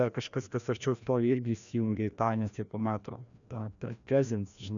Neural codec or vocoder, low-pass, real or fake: codec, 16 kHz, 1 kbps, FreqCodec, larger model; 7.2 kHz; fake